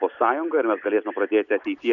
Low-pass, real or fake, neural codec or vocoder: 7.2 kHz; real; none